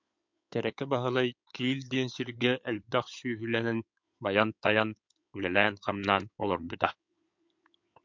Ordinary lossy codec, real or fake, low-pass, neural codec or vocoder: MP3, 64 kbps; fake; 7.2 kHz; codec, 16 kHz in and 24 kHz out, 2.2 kbps, FireRedTTS-2 codec